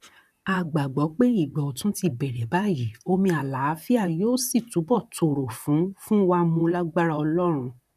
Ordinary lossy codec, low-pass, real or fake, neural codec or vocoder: none; 14.4 kHz; fake; vocoder, 44.1 kHz, 128 mel bands, Pupu-Vocoder